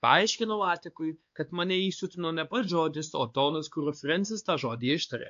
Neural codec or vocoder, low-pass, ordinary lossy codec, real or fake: codec, 16 kHz, 2 kbps, X-Codec, WavLM features, trained on Multilingual LibriSpeech; 7.2 kHz; AAC, 64 kbps; fake